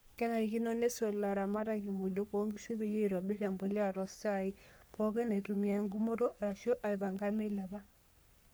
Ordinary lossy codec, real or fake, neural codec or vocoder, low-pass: none; fake; codec, 44.1 kHz, 3.4 kbps, Pupu-Codec; none